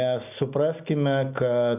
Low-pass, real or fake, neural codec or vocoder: 3.6 kHz; real; none